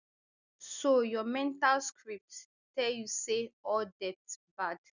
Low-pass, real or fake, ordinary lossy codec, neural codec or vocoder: 7.2 kHz; real; none; none